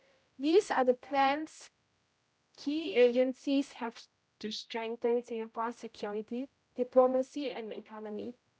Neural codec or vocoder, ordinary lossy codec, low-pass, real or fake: codec, 16 kHz, 0.5 kbps, X-Codec, HuBERT features, trained on general audio; none; none; fake